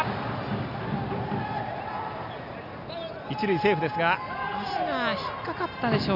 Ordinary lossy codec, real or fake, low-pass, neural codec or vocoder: none; real; 5.4 kHz; none